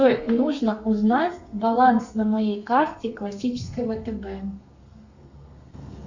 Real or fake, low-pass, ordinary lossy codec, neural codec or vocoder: fake; 7.2 kHz; Opus, 64 kbps; codec, 32 kHz, 1.9 kbps, SNAC